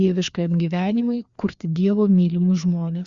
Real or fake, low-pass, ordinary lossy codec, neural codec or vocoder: fake; 7.2 kHz; Opus, 64 kbps; codec, 16 kHz, 2 kbps, FreqCodec, larger model